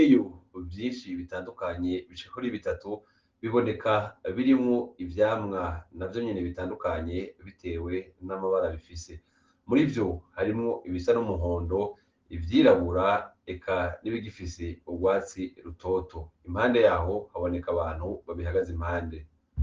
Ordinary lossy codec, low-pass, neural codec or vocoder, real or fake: Opus, 16 kbps; 7.2 kHz; none; real